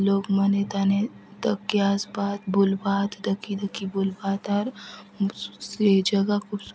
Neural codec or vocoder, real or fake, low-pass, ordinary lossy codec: none; real; none; none